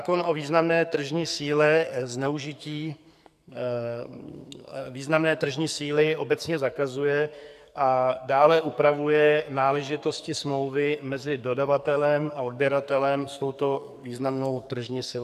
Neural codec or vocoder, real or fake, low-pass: codec, 44.1 kHz, 2.6 kbps, SNAC; fake; 14.4 kHz